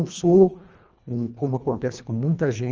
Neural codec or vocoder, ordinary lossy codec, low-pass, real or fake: codec, 24 kHz, 1.5 kbps, HILCodec; Opus, 24 kbps; 7.2 kHz; fake